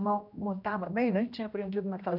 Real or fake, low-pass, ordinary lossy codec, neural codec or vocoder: fake; 5.4 kHz; none; codec, 16 kHz, 1 kbps, X-Codec, HuBERT features, trained on general audio